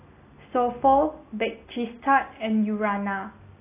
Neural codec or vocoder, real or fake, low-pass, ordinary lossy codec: none; real; 3.6 kHz; AAC, 24 kbps